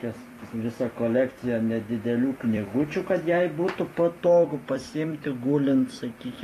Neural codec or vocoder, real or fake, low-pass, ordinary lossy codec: vocoder, 44.1 kHz, 128 mel bands every 256 samples, BigVGAN v2; fake; 14.4 kHz; AAC, 48 kbps